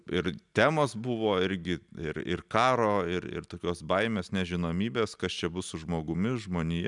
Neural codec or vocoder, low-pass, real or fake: none; 10.8 kHz; real